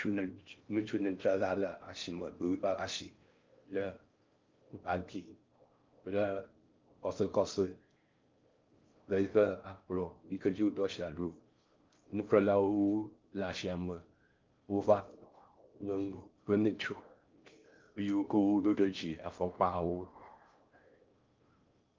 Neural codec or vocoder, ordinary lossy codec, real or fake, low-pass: codec, 16 kHz in and 24 kHz out, 0.6 kbps, FocalCodec, streaming, 4096 codes; Opus, 24 kbps; fake; 7.2 kHz